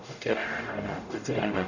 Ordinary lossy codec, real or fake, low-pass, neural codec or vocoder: none; fake; 7.2 kHz; codec, 44.1 kHz, 0.9 kbps, DAC